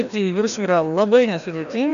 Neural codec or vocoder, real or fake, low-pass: codec, 16 kHz, 1 kbps, FreqCodec, larger model; fake; 7.2 kHz